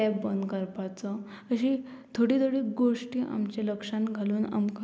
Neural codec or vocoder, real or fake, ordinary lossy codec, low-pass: none; real; none; none